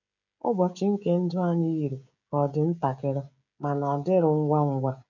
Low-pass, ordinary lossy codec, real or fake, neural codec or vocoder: 7.2 kHz; none; fake; codec, 16 kHz, 16 kbps, FreqCodec, smaller model